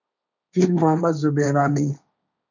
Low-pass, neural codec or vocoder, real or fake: 7.2 kHz; codec, 16 kHz, 1.1 kbps, Voila-Tokenizer; fake